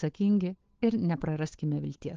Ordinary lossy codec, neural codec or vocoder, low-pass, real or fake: Opus, 16 kbps; codec, 16 kHz, 8 kbps, FunCodec, trained on Chinese and English, 25 frames a second; 7.2 kHz; fake